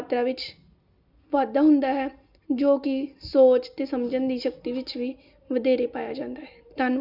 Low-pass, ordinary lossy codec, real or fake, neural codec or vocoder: 5.4 kHz; none; real; none